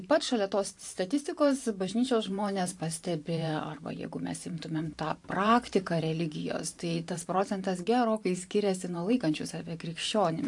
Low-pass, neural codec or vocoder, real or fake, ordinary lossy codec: 10.8 kHz; vocoder, 44.1 kHz, 128 mel bands every 512 samples, BigVGAN v2; fake; AAC, 64 kbps